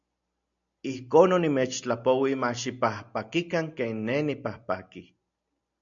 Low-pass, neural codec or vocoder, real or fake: 7.2 kHz; none; real